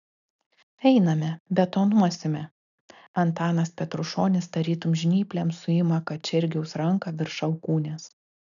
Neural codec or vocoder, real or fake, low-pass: none; real; 7.2 kHz